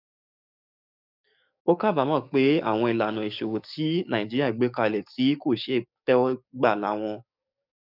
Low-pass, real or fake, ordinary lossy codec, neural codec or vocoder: 5.4 kHz; fake; none; codec, 44.1 kHz, 7.8 kbps, DAC